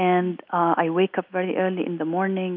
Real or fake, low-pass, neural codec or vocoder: real; 5.4 kHz; none